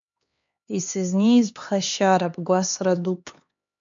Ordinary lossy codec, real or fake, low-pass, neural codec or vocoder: MP3, 64 kbps; fake; 7.2 kHz; codec, 16 kHz, 2 kbps, X-Codec, HuBERT features, trained on LibriSpeech